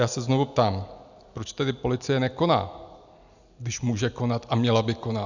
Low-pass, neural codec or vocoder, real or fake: 7.2 kHz; none; real